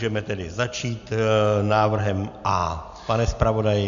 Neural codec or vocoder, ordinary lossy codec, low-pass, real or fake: none; AAC, 96 kbps; 7.2 kHz; real